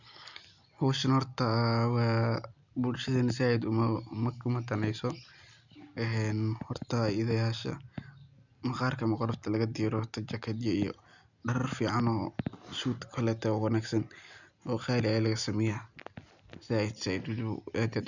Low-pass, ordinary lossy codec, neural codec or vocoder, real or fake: 7.2 kHz; none; none; real